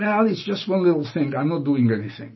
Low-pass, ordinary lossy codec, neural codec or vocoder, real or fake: 7.2 kHz; MP3, 24 kbps; none; real